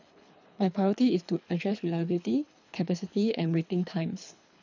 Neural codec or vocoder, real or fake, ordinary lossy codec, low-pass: codec, 24 kHz, 3 kbps, HILCodec; fake; none; 7.2 kHz